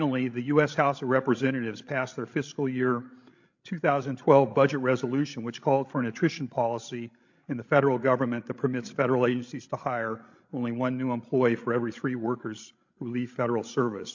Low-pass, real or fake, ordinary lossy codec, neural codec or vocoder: 7.2 kHz; fake; MP3, 48 kbps; codec, 16 kHz, 16 kbps, FreqCodec, larger model